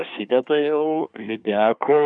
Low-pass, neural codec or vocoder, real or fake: 9.9 kHz; codec, 24 kHz, 1 kbps, SNAC; fake